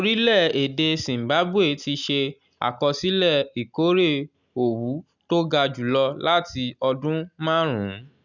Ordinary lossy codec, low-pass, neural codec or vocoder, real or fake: none; 7.2 kHz; none; real